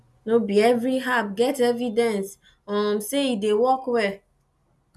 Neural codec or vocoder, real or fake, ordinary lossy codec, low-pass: none; real; none; none